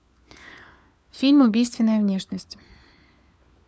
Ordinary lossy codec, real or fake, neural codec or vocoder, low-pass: none; fake; codec, 16 kHz, 4 kbps, FunCodec, trained on LibriTTS, 50 frames a second; none